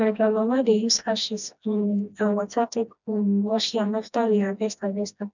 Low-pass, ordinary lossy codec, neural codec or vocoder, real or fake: 7.2 kHz; none; codec, 16 kHz, 1 kbps, FreqCodec, smaller model; fake